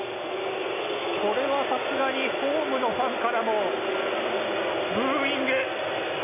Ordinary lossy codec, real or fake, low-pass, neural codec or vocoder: none; real; 3.6 kHz; none